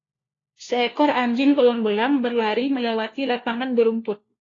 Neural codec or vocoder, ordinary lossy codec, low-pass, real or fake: codec, 16 kHz, 1 kbps, FunCodec, trained on LibriTTS, 50 frames a second; AAC, 32 kbps; 7.2 kHz; fake